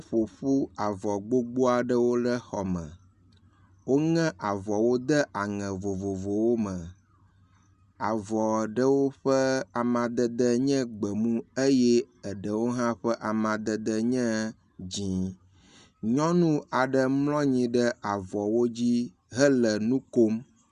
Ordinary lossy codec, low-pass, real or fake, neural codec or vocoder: Opus, 64 kbps; 10.8 kHz; real; none